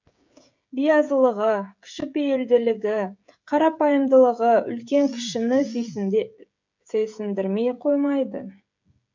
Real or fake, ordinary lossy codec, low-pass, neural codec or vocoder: fake; MP3, 64 kbps; 7.2 kHz; codec, 16 kHz, 16 kbps, FreqCodec, smaller model